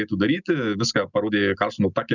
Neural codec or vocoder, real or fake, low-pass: none; real; 7.2 kHz